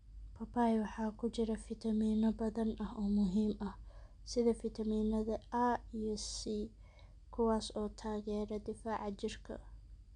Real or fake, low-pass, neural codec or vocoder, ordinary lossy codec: real; 9.9 kHz; none; MP3, 96 kbps